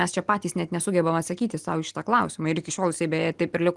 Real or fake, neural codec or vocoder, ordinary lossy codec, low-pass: real; none; Opus, 24 kbps; 10.8 kHz